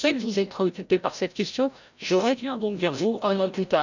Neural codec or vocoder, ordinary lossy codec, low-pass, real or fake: codec, 16 kHz, 0.5 kbps, FreqCodec, larger model; none; 7.2 kHz; fake